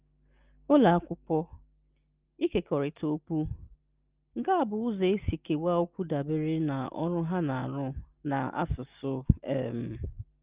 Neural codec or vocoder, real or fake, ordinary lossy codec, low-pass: none; real; Opus, 32 kbps; 3.6 kHz